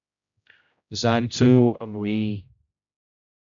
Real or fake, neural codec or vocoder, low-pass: fake; codec, 16 kHz, 0.5 kbps, X-Codec, HuBERT features, trained on general audio; 7.2 kHz